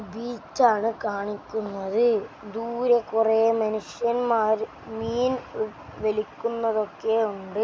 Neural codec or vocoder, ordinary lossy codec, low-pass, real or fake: none; none; none; real